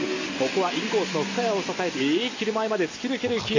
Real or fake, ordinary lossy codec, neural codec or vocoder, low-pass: real; AAC, 48 kbps; none; 7.2 kHz